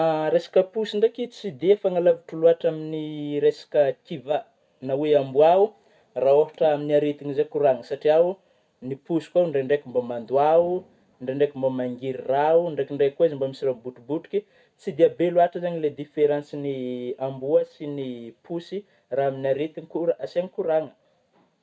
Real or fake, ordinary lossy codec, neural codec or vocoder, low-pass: real; none; none; none